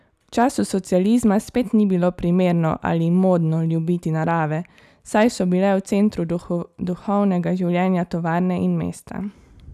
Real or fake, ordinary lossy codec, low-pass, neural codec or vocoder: real; none; 14.4 kHz; none